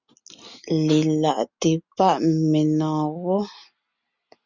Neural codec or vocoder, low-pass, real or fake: none; 7.2 kHz; real